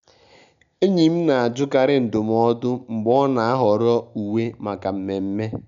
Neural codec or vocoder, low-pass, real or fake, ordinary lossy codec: none; 7.2 kHz; real; none